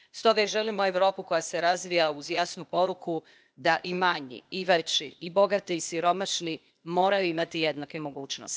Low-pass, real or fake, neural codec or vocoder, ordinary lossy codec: none; fake; codec, 16 kHz, 0.8 kbps, ZipCodec; none